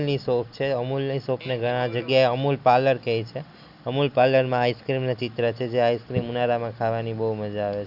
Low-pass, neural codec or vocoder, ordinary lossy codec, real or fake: 5.4 kHz; none; none; real